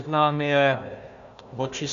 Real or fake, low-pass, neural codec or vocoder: fake; 7.2 kHz; codec, 16 kHz, 1 kbps, FunCodec, trained on Chinese and English, 50 frames a second